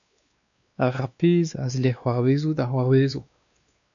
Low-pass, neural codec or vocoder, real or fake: 7.2 kHz; codec, 16 kHz, 2 kbps, X-Codec, WavLM features, trained on Multilingual LibriSpeech; fake